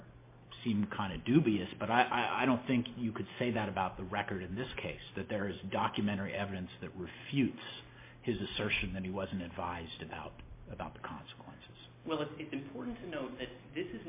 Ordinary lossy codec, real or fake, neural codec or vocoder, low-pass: MP3, 24 kbps; real; none; 3.6 kHz